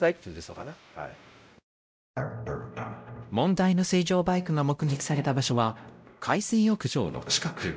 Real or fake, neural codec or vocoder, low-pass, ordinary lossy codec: fake; codec, 16 kHz, 0.5 kbps, X-Codec, WavLM features, trained on Multilingual LibriSpeech; none; none